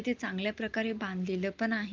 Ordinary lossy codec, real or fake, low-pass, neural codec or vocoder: Opus, 24 kbps; real; 7.2 kHz; none